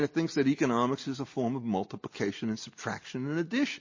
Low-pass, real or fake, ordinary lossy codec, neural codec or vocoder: 7.2 kHz; real; MP3, 32 kbps; none